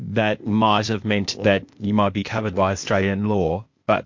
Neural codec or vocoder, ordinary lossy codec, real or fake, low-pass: codec, 16 kHz, 0.8 kbps, ZipCodec; MP3, 48 kbps; fake; 7.2 kHz